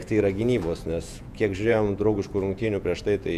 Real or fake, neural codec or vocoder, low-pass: fake; vocoder, 48 kHz, 128 mel bands, Vocos; 14.4 kHz